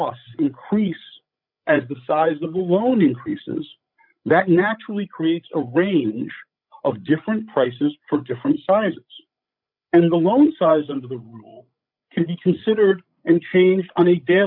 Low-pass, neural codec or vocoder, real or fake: 5.4 kHz; codec, 16 kHz, 16 kbps, FreqCodec, larger model; fake